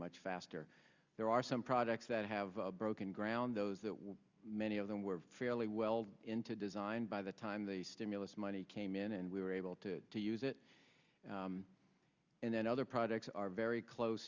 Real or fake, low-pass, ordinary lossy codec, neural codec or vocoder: real; 7.2 kHz; Opus, 64 kbps; none